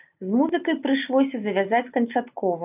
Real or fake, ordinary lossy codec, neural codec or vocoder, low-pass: real; AAC, 32 kbps; none; 3.6 kHz